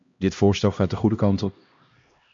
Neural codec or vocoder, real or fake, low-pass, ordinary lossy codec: codec, 16 kHz, 1 kbps, X-Codec, HuBERT features, trained on LibriSpeech; fake; 7.2 kHz; AAC, 64 kbps